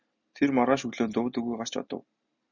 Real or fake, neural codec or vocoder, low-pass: real; none; 7.2 kHz